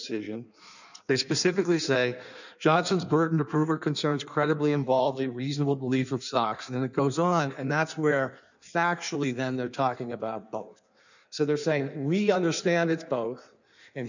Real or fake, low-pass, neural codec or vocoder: fake; 7.2 kHz; codec, 16 kHz in and 24 kHz out, 1.1 kbps, FireRedTTS-2 codec